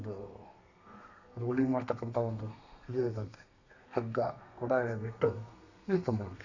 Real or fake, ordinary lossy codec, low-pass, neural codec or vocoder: fake; none; 7.2 kHz; codec, 32 kHz, 1.9 kbps, SNAC